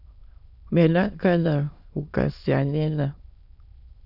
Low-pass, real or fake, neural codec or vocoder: 5.4 kHz; fake; autoencoder, 22.05 kHz, a latent of 192 numbers a frame, VITS, trained on many speakers